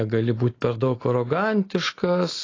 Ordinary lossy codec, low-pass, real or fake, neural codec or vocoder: AAC, 32 kbps; 7.2 kHz; real; none